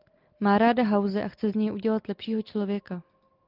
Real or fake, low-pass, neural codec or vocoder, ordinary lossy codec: real; 5.4 kHz; none; Opus, 24 kbps